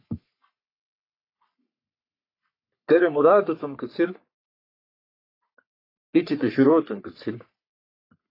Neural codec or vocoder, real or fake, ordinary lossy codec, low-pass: codec, 44.1 kHz, 3.4 kbps, Pupu-Codec; fake; AAC, 32 kbps; 5.4 kHz